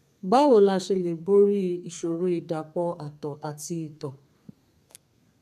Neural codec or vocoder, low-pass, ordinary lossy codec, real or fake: codec, 32 kHz, 1.9 kbps, SNAC; 14.4 kHz; none; fake